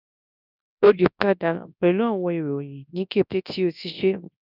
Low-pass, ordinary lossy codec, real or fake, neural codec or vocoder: 5.4 kHz; none; fake; codec, 24 kHz, 0.9 kbps, WavTokenizer, large speech release